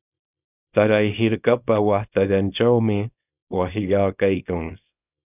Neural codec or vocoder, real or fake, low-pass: codec, 24 kHz, 0.9 kbps, WavTokenizer, small release; fake; 3.6 kHz